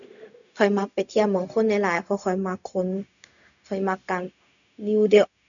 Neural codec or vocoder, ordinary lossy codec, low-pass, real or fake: codec, 16 kHz, 0.4 kbps, LongCat-Audio-Codec; none; 7.2 kHz; fake